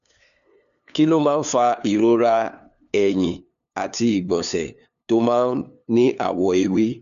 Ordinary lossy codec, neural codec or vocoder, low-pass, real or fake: none; codec, 16 kHz, 2 kbps, FunCodec, trained on LibriTTS, 25 frames a second; 7.2 kHz; fake